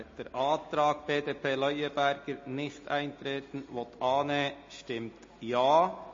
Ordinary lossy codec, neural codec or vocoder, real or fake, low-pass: MP3, 32 kbps; none; real; 7.2 kHz